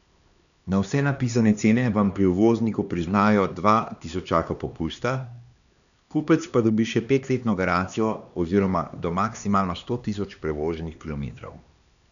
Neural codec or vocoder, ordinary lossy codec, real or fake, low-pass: codec, 16 kHz, 2 kbps, X-Codec, HuBERT features, trained on LibriSpeech; none; fake; 7.2 kHz